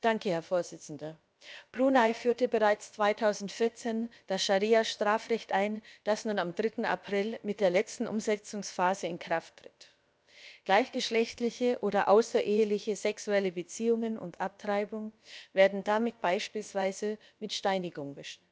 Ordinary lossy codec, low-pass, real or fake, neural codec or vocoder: none; none; fake; codec, 16 kHz, about 1 kbps, DyCAST, with the encoder's durations